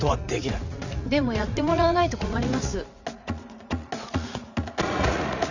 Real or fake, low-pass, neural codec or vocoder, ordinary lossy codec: fake; 7.2 kHz; vocoder, 44.1 kHz, 80 mel bands, Vocos; none